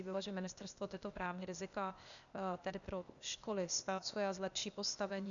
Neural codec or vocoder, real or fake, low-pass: codec, 16 kHz, 0.8 kbps, ZipCodec; fake; 7.2 kHz